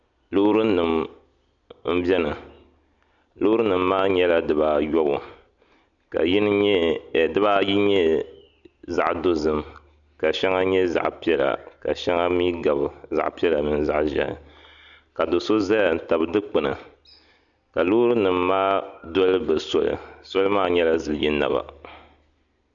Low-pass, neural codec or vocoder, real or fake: 7.2 kHz; none; real